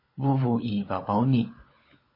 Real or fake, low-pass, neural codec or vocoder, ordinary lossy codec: fake; 5.4 kHz; codec, 16 kHz, 16 kbps, FunCodec, trained on LibriTTS, 50 frames a second; MP3, 24 kbps